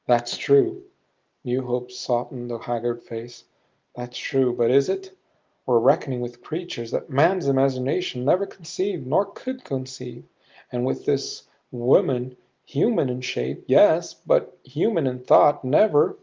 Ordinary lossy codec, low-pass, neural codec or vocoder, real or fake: Opus, 32 kbps; 7.2 kHz; none; real